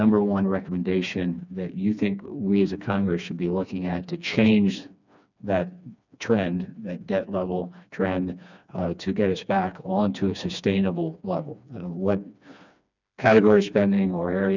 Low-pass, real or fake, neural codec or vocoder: 7.2 kHz; fake; codec, 16 kHz, 2 kbps, FreqCodec, smaller model